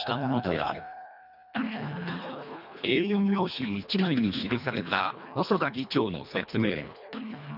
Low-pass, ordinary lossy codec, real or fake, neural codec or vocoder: 5.4 kHz; none; fake; codec, 24 kHz, 1.5 kbps, HILCodec